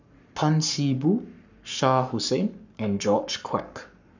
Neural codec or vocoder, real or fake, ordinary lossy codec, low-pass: codec, 44.1 kHz, 7.8 kbps, Pupu-Codec; fake; none; 7.2 kHz